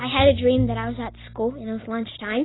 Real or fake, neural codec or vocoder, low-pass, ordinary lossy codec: real; none; 7.2 kHz; AAC, 16 kbps